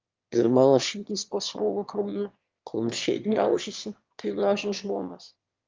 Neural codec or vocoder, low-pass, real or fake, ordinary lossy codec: autoencoder, 22.05 kHz, a latent of 192 numbers a frame, VITS, trained on one speaker; 7.2 kHz; fake; Opus, 32 kbps